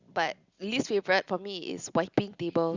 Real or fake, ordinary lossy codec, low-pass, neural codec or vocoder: real; Opus, 64 kbps; 7.2 kHz; none